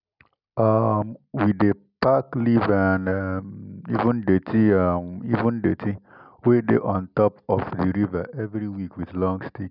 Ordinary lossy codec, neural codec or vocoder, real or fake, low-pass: none; none; real; 5.4 kHz